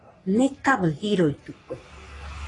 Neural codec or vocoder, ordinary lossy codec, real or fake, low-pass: codec, 44.1 kHz, 3.4 kbps, Pupu-Codec; AAC, 32 kbps; fake; 10.8 kHz